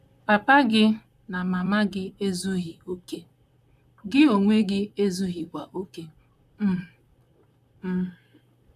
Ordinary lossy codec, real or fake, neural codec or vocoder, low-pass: none; fake; vocoder, 44.1 kHz, 128 mel bands, Pupu-Vocoder; 14.4 kHz